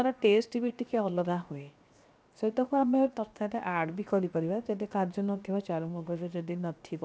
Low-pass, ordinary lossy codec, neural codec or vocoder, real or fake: none; none; codec, 16 kHz, 0.7 kbps, FocalCodec; fake